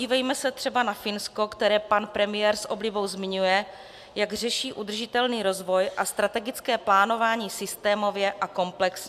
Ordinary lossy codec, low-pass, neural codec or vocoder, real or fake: AAC, 96 kbps; 14.4 kHz; none; real